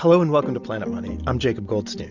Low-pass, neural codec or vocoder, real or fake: 7.2 kHz; none; real